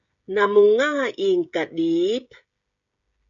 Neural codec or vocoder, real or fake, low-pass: codec, 16 kHz, 16 kbps, FreqCodec, smaller model; fake; 7.2 kHz